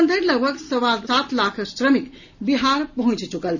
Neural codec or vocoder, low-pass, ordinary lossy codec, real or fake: none; 7.2 kHz; none; real